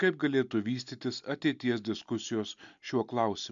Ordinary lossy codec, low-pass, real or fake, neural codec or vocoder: MP3, 64 kbps; 7.2 kHz; real; none